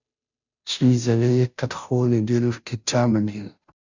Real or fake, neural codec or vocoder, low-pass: fake; codec, 16 kHz, 0.5 kbps, FunCodec, trained on Chinese and English, 25 frames a second; 7.2 kHz